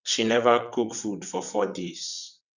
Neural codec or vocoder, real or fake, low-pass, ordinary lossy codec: vocoder, 22.05 kHz, 80 mel bands, WaveNeXt; fake; 7.2 kHz; none